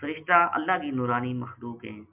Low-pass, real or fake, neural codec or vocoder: 3.6 kHz; real; none